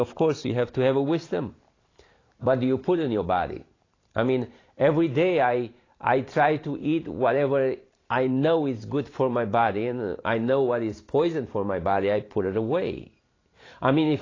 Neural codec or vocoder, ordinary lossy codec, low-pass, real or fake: vocoder, 44.1 kHz, 128 mel bands every 512 samples, BigVGAN v2; AAC, 32 kbps; 7.2 kHz; fake